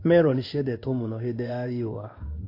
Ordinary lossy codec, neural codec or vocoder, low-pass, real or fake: AAC, 32 kbps; codec, 16 kHz in and 24 kHz out, 1 kbps, XY-Tokenizer; 5.4 kHz; fake